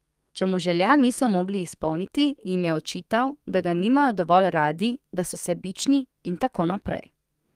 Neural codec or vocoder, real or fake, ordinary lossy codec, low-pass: codec, 32 kHz, 1.9 kbps, SNAC; fake; Opus, 32 kbps; 14.4 kHz